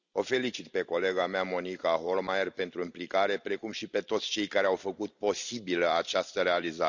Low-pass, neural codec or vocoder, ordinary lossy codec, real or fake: 7.2 kHz; none; none; real